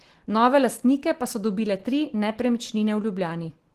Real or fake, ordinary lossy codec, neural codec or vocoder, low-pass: real; Opus, 16 kbps; none; 14.4 kHz